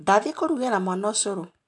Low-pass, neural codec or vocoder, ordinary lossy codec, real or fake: 10.8 kHz; none; AAC, 64 kbps; real